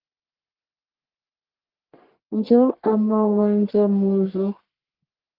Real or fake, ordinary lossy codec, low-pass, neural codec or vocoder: fake; Opus, 16 kbps; 5.4 kHz; codec, 44.1 kHz, 1.7 kbps, Pupu-Codec